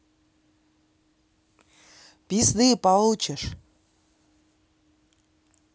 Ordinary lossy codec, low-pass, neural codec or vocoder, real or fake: none; none; none; real